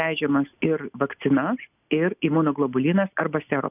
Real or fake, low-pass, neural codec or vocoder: real; 3.6 kHz; none